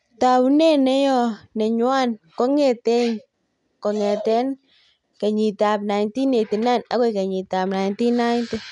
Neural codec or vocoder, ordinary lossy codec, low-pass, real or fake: none; none; 10.8 kHz; real